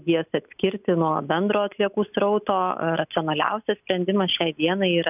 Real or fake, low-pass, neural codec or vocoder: real; 3.6 kHz; none